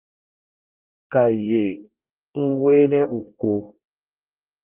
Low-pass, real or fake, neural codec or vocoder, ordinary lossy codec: 3.6 kHz; fake; codec, 24 kHz, 1 kbps, SNAC; Opus, 32 kbps